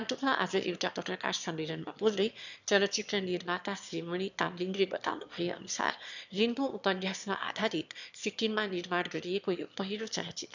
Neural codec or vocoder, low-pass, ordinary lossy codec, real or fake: autoencoder, 22.05 kHz, a latent of 192 numbers a frame, VITS, trained on one speaker; 7.2 kHz; none; fake